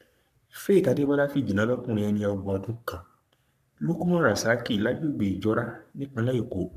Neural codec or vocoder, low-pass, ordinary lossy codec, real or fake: codec, 44.1 kHz, 3.4 kbps, Pupu-Codec; 14.4 kHz; none; fake